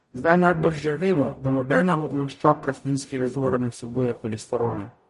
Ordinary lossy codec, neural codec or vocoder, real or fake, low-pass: MP3, 48 kbps; codec, 44.1 kHz, 0.9 kbps, DAC; fake; 14.4 kHz